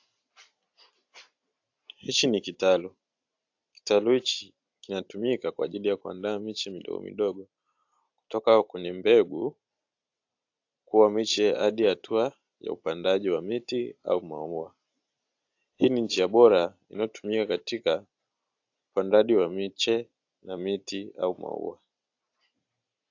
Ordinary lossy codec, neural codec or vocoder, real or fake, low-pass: AAC, 48 kbps; none; real; 7.2 kHz